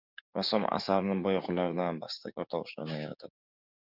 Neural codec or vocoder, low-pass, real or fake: codec, 16 kHz, 6 kbps, DAC; 5.4 kHz; fake